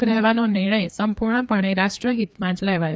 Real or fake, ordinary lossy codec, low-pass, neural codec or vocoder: fake; none; none; codec, 16 kHz, 2 kbps, FreqCodec, larger model